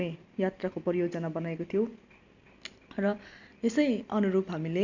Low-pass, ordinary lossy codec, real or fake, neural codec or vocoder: 7.2 kHz; none; real; none